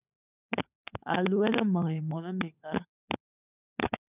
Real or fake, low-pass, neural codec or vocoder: fake; 3.6 kHz; codec, 16 kHz, 16 kbps, FunCodec, trained on LibriTTS, 50 frames a second